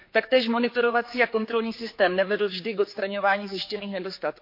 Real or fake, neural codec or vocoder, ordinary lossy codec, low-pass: fake; codec, 16 kHz, 4 kbps, X-Codec, HuBERT features, trained on general audio; MP3, 32 kbps; 5.4 kHz